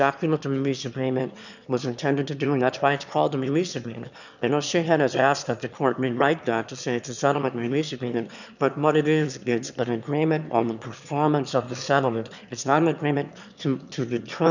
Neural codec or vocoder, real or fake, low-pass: autoencoder, 22.05 kHz, a latent of 192 numbers a frame, VITS, trained on one speaker; fake; 7.2 kHz